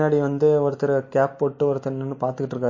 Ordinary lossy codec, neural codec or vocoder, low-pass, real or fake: MP3, 32 kbps; none; 7.2 kHz; real